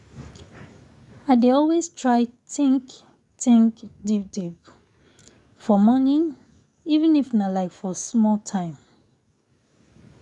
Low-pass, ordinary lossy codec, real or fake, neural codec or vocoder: 10.8 kHz; none; fake; codec, 44.1 kHz, 7.8 kbps, DAC